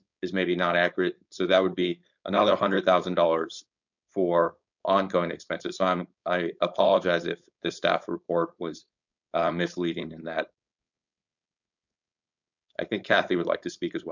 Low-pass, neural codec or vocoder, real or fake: 7.2 kHz; codec, 16 kHz, 4.8 kbps, FACodec; fake